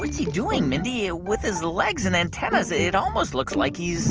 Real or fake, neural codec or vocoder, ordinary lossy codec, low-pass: real; none; Opus, 24 kbps; 7.2 kHz